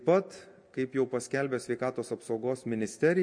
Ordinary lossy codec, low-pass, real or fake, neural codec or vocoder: MP3, 48 kbps; 9.9 kHz; real; none